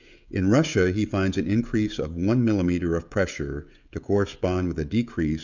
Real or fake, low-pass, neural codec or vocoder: fake; 7.2 kHz; codec, 16 kHz, 16 kbps, FreqCodec, smaller model